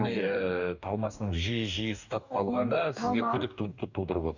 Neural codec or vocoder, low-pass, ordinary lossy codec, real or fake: codec, 44.1 kHz, 2.6 kbps, DAC; 7.2 kHz; none; fake